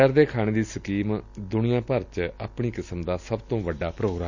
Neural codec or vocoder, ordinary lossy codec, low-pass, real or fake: none; none; 7.2 kHz; real